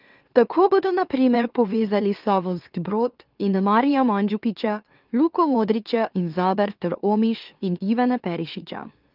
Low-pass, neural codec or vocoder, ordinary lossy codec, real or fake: 5.4 kHz; autoencoder, 44.1 kHz, a latent of 192 numbers a frame, MeloTTS; Opus, 32 kbps; fake